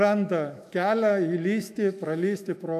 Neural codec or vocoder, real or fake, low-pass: autoencoder, 48 kHz, 128 numbers a frame, DAC-VAE, trained on Japanese speech; fake; 14.4 kHz